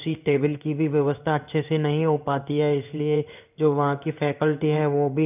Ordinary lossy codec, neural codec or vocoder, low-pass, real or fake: none; codec, 16 kHz in and 24 kHz out, 1 kbps, XY-Tokenizer; 3.6 kHz; fake